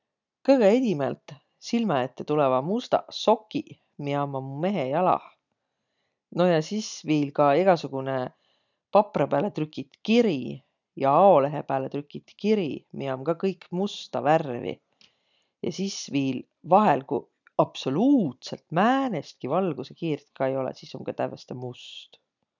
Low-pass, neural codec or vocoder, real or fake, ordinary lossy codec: 7.2 kHz; none; real; none